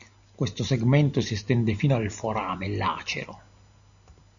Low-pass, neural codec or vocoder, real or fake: 7.2 kHz; none; real